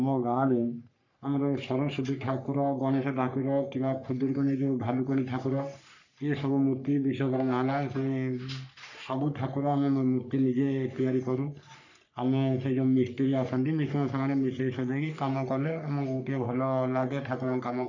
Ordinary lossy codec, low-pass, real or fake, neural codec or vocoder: none; 7.2 kHz; fake; codec, 44.1 kHz, 3.4 kbps, Pupu-Codec